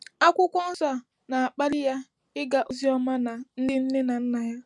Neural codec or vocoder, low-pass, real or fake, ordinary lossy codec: none; 10.8 kHz; real; none